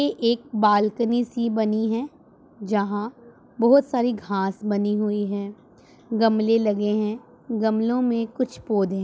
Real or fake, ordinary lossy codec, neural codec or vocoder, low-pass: real; none; none; none